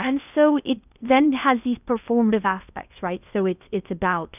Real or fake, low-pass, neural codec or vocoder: fake; 3.6 kHz; codec, 16 kHz in and 24 kHz out, 0.6 kbps, FocalCodec, streaming, 2048 codes